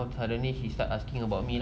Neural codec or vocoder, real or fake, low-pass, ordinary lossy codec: none; real; none; none